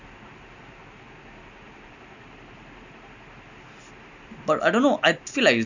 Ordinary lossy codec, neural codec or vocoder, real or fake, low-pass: none; none; real; 7.2 kHz